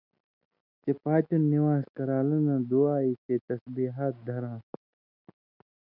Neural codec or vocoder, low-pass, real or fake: none; 5.4 kHz; real